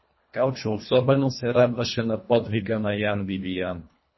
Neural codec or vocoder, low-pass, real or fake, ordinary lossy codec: codec, 24 kHz, 1.5 kbps, HILCodec; 7.2 kHz; fake; MP3, 24 kbps